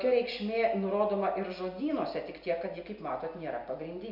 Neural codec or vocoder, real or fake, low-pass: none; real; 5.4 kHz